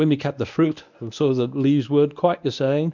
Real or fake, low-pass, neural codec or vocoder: fake; 7.2 kHz; codec, 24 kHz, 0.9 kbps, WavTokenizer, medium speech release version 1